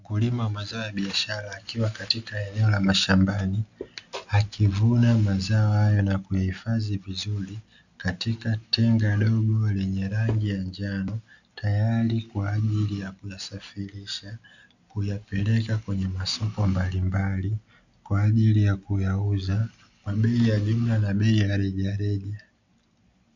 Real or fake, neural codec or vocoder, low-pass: real; none; 7.2 kHz